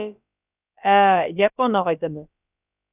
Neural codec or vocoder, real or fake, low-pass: codec, 16 kHz, about 1 kbps, DyCAST, with the encoder's durations; fake; 3.6 kHz